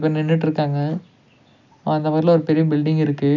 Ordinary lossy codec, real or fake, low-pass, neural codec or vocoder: none; real; 7.2 kHz; none